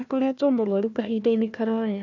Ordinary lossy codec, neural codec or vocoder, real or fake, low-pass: none; codec, 16 kHz, 1 kbps, FunCodec, trained on Chinese and English, 50 frames a second; fake; 7.2 kHz